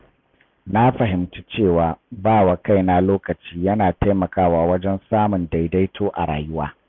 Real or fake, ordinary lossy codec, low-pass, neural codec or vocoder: real; none; 7.2 kHz; none